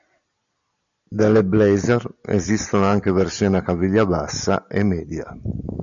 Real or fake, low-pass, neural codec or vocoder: real; 7.2 kHz; none